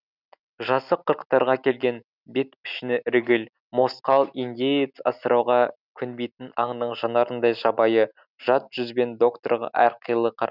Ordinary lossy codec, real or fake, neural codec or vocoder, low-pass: none; real; none; 5.4 kHz